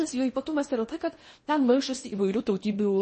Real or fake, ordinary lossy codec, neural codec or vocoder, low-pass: fake; MP3, 32 kbps; codec, 16 kHz in and 24 kHz out, 0.8 kbps, FocalCodec, streaming, 65536 codes; 10.8 kHz